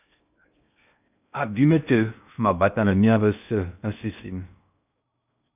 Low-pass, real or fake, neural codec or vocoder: 3.6 kHz; fake; codec, 16 kHz in and 24 kHz out, 0.6 kbps, FocalCodec, streaming, 4096 codes